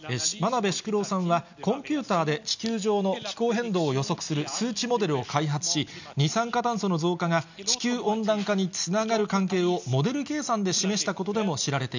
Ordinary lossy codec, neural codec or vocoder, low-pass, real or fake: none; none; 7.2 kHz; real